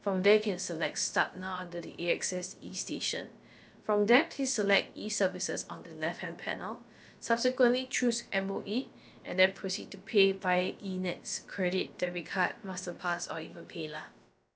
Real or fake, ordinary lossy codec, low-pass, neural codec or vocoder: fake; none; none; codec, 16 kHz, about 1 kbps, DyCAST, with the encoder's durations